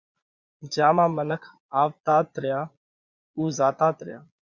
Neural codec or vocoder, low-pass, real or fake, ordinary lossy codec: vocoder, 22.05 kHz, 80 mel bands, Vocos; 7.2 kHz; fake; Opus, 64 kbps